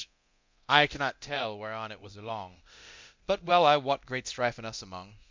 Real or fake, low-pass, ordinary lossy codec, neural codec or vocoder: fake; 7.2 kHz; MP3, 64 kbps; codec, 24 kHz, 0.9 kbps, DualCodec